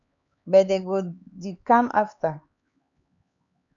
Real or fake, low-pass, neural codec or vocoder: fake; 7.2 kHz; codec, 16 kHz, 4 kbps, X-Codec, HuBERT features, trained on LibriSpeech